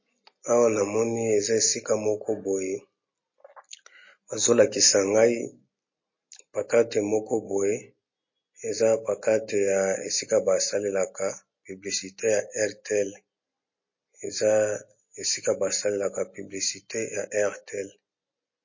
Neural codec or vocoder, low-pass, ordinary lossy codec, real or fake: none; 7.2 kHz; MP3, 32 kbps; real